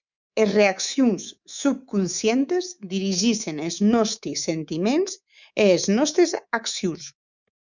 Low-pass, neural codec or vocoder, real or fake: 7.2 kHz; codec, 24 kHz, 3.1 kbps, DualCodec; fake